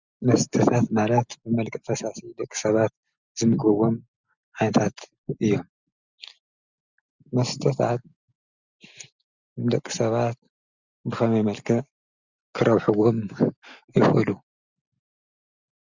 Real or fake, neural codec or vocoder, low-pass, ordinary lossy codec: real; none; 7.2 kHz; Opus, 64 kbps